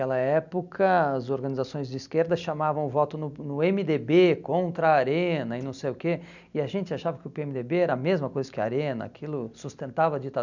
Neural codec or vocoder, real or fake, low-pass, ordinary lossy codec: none; real; 7.2 kHz; none